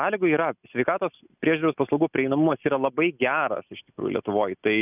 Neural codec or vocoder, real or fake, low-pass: none; real; 3.6 kHz